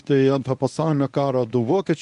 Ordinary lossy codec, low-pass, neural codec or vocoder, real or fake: MP3, 64 kbps; 10.8 kHz; codec, 24 kHz, 0.9 kbps, WavTokenizer, medium speech release version 1; fake